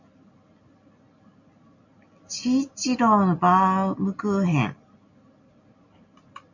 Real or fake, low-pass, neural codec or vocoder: real; 7.2 kHz; none